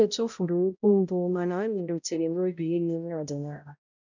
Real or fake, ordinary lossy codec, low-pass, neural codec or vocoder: fake; none; 7.2 kHz; codec, 16 kHz, 0.5 kbps, X-Codec, HuBERT features, trained on balanced general audio